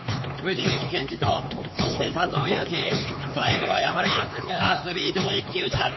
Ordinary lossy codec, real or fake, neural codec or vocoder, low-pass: MP3, 24 kbps; fake; codec, 16 kHz, 4 kbps, X-Codec, HuBERT features, trained on LibriSpeech; 7.2 kHz